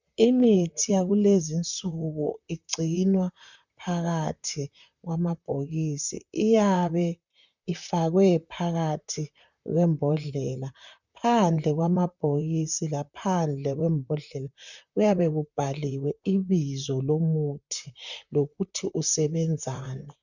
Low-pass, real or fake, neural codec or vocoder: 7.2 kHz; fake; vocoder, 44.1 kHz, 128 mel bands, Pupu-Vocoder